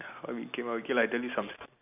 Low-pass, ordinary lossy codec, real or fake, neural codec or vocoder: 3.6 kHz; none; real; none